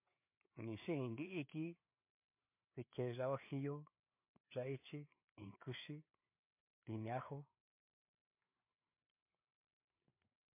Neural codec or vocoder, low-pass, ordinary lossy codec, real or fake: codec, 16 kHz, 4 kbps, FreqCodec, larger model; 3.6 kHz; MP3, 24 kbps; fake